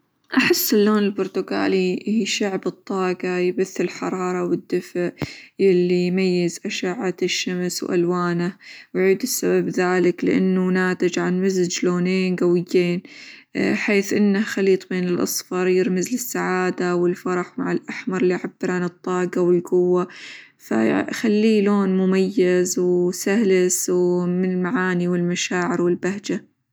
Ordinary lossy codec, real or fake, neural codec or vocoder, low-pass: none; real; none; none